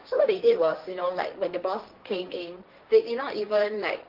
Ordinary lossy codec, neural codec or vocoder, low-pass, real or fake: Opus, 32 kbps; codec, 16 kHz, 1.1 kbps, Voila-Tokenizer; 5.4 kHz; fake